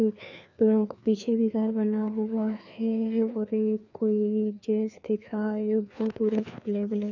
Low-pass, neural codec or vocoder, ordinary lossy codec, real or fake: 7.2 kHz; codec, 16 kHz, 2 kbps, FreqCodec, larger model; none; fake